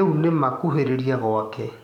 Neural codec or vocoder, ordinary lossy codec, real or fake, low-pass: codec, 44.1 kHz, 7.8 kbps, DAC; none; fake; 19.8 kHz